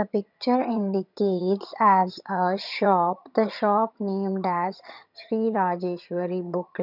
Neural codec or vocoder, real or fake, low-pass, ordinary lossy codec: vocoder, 22.05 kHz, 80 mel bands, HiFi-GAN; fake; 5.4 kHz; none